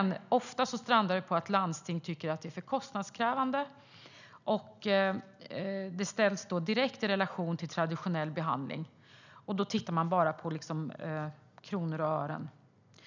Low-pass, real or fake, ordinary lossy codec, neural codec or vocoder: 7.2 kHz; real; none; none